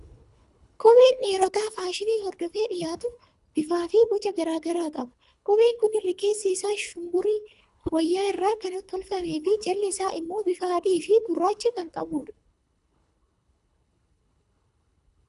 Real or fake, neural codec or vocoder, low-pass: fake; codec, 24 kHz, 3 kbps, HILCodec; 10.8 kHz